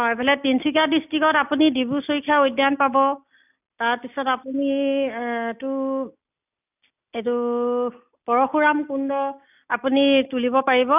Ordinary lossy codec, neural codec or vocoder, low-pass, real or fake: none; none; 3.6 kHz; real